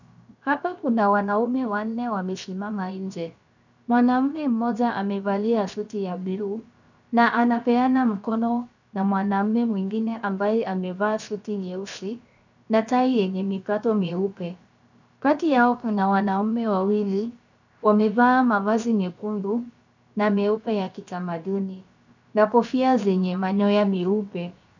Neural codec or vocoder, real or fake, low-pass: codec, 16 kHz, 0.7 kbps, FocalCodec; fake; 7.2 kHz